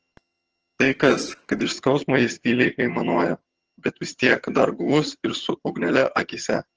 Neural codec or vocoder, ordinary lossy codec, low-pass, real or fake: vocoder, 22.05 kHz, 80 mel bands, HiFi-GAN; Opus, 16 kbps; 7.2 kHz; fake